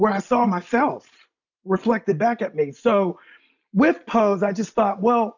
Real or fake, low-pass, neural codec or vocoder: real; 7.2 kHz; none